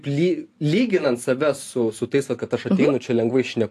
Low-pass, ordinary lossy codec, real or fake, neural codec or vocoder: 14.4 kHz; AAC, 64 kbps; real; none